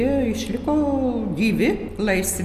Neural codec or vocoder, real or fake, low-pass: none; real; 14.4 kHz